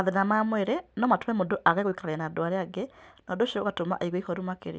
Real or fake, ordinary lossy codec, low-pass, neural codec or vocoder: real; none; none; none